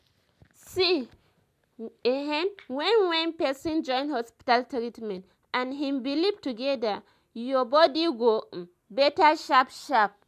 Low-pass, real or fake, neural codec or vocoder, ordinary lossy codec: 14.4 kHz; real; none; MP3, 96 kbps